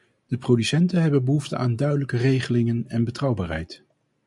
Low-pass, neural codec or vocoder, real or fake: 10.8 kHz; none; real